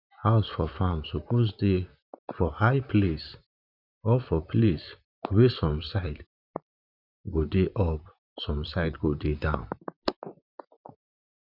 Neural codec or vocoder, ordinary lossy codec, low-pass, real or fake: vocoder, 44.1 kHz, 128 mel bands, Pupu-Vocoder; none; 5.4 kHz; fake